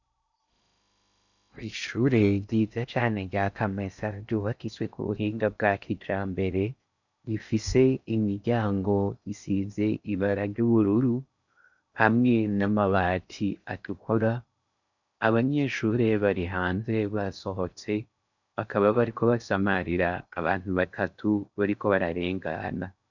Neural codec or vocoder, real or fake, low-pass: codec, 16 kHz in and 24 kHz out, 0.6 kbps, FocalCodec, streaming, 2048 codes; fake; 7.2 kHz